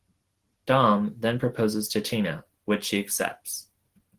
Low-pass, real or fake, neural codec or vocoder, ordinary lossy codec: 14.4 kHz; real; none; Opus, 16 kbps